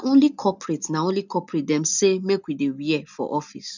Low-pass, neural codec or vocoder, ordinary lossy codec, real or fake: 7.2 kHz; none; none; real